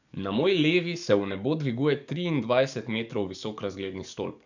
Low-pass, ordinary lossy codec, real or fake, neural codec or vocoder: 7.2 kHz; none; fake; codec, 44.1 kHz, 7.8 kbps, DAC